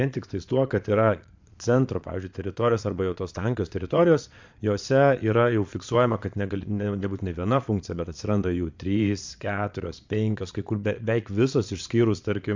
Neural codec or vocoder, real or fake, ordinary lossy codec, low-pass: vocoder, 22.05 kHz, 80 mel bands, Vocos; fake; MP3, 64 kbps; 7.2 kHz